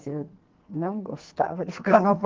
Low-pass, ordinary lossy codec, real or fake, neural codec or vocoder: 7.2 kHz; Opus, 16 kbps; fake; codec, 44.1 kHz, 2.6 kbps, SNAC